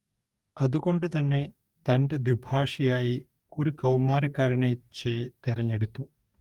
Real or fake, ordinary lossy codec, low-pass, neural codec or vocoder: fake; Opus, 32 kbps; 19.8 kHz; codec, 44.1 kHz, 2.6 kbps, DAC